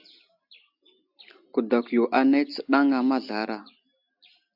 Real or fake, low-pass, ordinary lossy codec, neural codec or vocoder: real; 5.4 kHz; AAC, 48 kbps; none